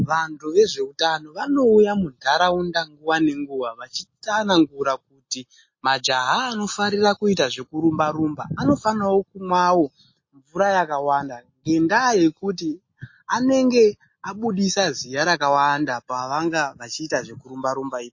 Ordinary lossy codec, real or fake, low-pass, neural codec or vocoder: MP3, 32 kbps; real; 7.2 kHz; none